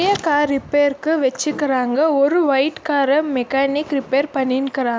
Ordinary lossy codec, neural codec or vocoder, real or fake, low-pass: none; none; real; none